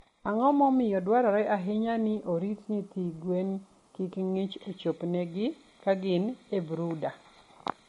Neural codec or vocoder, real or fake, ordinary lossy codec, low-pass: none; real; MP3, 48 kbps; 19.8 kHz